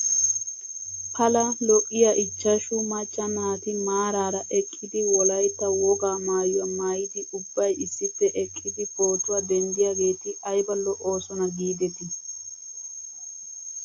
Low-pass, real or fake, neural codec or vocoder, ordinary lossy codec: 7.2 kHz; real; none; AAC, 48 kbps